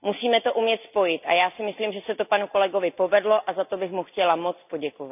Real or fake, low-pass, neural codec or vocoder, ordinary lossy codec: real; 3.6 kHz; none; none